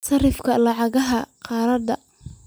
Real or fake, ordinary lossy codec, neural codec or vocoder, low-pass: real; none; none; none